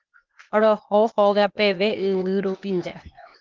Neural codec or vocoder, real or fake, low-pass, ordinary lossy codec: codec, 16 kHz, 0.8 kbps, ZipCodec; fake; 7.2 kHz; Opus, 32 kbps